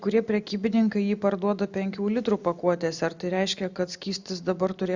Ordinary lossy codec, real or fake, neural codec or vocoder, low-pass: Opus, 64 kbps; real; none; 7.2 kHz